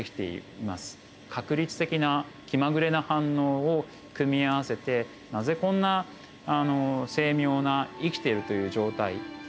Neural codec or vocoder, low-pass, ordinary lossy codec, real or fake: none; none; none; real